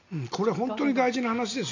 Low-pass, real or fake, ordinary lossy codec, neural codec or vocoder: 7.2 kHz; real; none; none